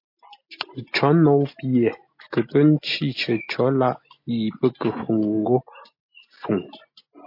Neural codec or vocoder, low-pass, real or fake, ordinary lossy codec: none; 5.4 kHz; real; MP3, 32 kbps